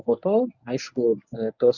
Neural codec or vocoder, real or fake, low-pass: codec, 24 kHz, 0.9 kbps, WavTokenizer, medium speech release version 1; fake; 7.2 kHz